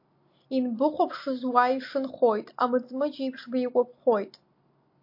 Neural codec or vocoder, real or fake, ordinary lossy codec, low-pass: none; real; MP3, 32 kbps; 5.4 kHz